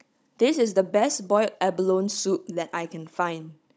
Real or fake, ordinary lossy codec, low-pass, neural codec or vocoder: fake; none; none; codec, 16 kHz, 16 kbps, FunCodec, trained on Chinese and English, 50 frames a second